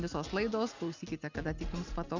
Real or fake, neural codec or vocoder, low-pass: real; none; 7.2 kHz